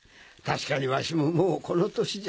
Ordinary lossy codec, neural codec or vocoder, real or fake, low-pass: none; none; real; none